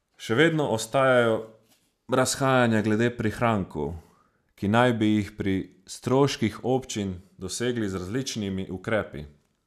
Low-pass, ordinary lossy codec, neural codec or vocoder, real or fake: 14.4 kHz; none; none; real